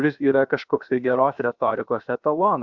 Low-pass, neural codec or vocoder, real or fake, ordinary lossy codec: 7.2 kHz; codec, 16 kHz, 0.7 kbps, FocalCodec; fake; Opus, 64 kbps